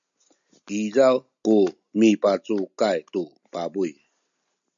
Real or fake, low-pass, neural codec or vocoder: real; 7.2 kHz; none